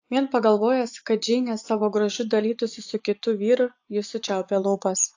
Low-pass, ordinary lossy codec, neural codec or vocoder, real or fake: 7.2 kHz; AAC, 48 kbps; vocoder, 24 kHz, 100 mel bands, Vocos; fake